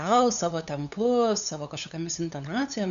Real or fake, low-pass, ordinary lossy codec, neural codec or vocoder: fake; 7.2 kHz; MP3, 96 kbps; codec, 16 kHz, 8 kbps, FunCodec, trained on LibriTTS, 25 frames a second